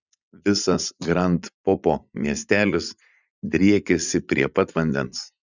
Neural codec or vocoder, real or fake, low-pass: vocoder, 44.1 kHz, 80 mel bands, Vocos; fake; 7.2 kHz